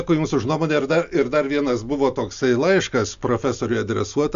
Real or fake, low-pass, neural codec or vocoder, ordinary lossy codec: real; 7.2 kHz; none; AAC, 96 kbps